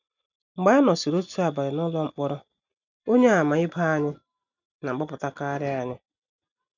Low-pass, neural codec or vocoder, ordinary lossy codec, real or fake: 7.2 kHz; none; none; real